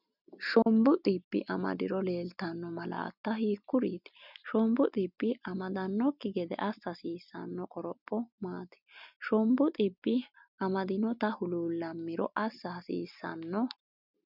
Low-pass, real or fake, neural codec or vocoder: 5.4 kHz; real; none